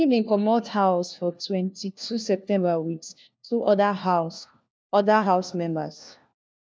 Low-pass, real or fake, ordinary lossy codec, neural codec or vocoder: none; fake; none; codec, 16 kHz, 1 kbps, FunCodec, trained on LibriTTS, 50 frames a second